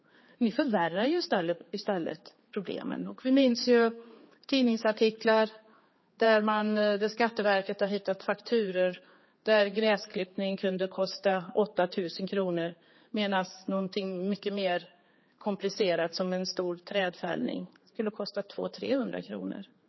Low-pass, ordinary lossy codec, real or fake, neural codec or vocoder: 7.2 kHz; MP3, 24 kbps; fake; codec, 16 kHz, 4 kbps, X-Codec, HuBERT features, trained on general audio